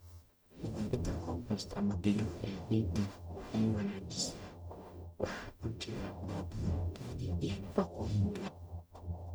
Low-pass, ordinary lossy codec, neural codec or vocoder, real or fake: none; none; codec, 44.1 kHz, 0.9 kbps, DAC; fake